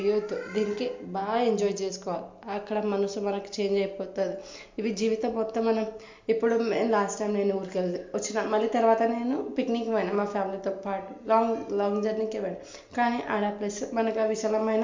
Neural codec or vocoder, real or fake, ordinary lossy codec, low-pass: none; real; MP3, 48 kbps; 7.2 kHz